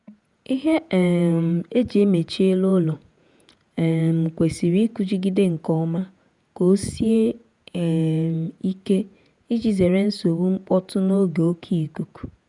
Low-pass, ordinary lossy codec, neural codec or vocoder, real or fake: 10.8 kHz; none; vocoder, 48 kHz, 128 mel bands, Vocos; fake